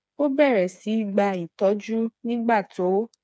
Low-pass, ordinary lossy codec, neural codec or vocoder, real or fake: none; none; codec, 16 kHz, 4 kbps, FreqCodec, smaller model; fake